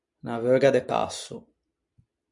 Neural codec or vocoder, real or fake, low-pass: none; real; 10.8 kHz